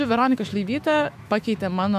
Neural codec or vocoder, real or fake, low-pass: autoencoder, 48 kHz, 128 numbers a frame, DAC-VAE, trained on Japanese speech; fake; 14.4 kHz